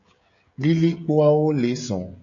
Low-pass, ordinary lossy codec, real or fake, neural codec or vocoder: 7.2 kHz; AAC, 64 kbps; fake; codec, 16 kHz, 16 kbps, FreqCodec, smaller model